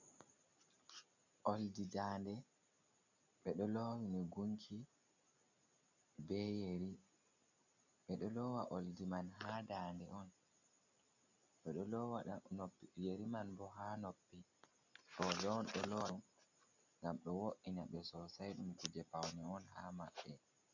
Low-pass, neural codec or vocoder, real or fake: 7.2 kHz; none; real